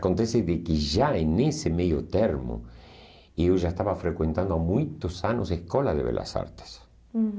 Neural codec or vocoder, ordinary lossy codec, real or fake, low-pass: none; none; real; none